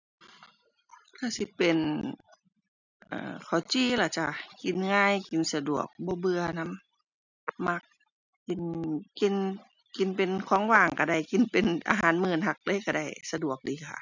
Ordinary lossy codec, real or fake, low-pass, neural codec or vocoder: none; real; 7.2 kHz; none